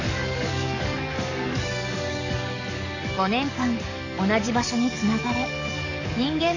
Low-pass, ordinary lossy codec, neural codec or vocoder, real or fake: 7.2 kHz; none; codec, 44.1 kHz, 7.8 kbps, DAC; fake